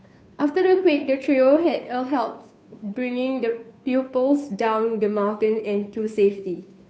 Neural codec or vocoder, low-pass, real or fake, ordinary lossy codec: codec, 16 kHz, 2 kbps, FunCodec, trained on Chinese and English, 25 frames a second; none; fake; none